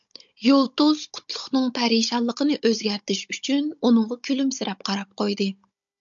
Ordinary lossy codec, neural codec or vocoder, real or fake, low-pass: MP3, 64 kbps; codec, 16 kHz, 16 kbps, FunCodec, trained on Chinese and English, 50 frames a second; fake; 7.2 kHz